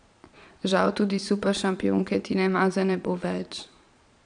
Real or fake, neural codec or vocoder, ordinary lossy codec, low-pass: fake; vocoder, 22.05 kHz, 80 mel bands, Vocos; none; 9.9 kHz